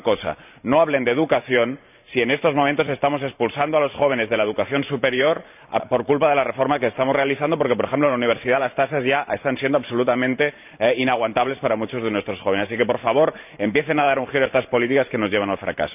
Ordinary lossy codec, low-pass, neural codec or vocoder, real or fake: none; 3.6 kHz; vocoder, 44.1 kHz, 128 mel bands every 512 samples, BigVGAN v2; fake